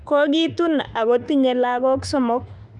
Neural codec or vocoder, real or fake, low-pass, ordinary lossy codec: autoencoder, 48 kHz, 32 numbers a frame, DAC-VAE, trained on Japanese speech; fake; 10.8 kHz; none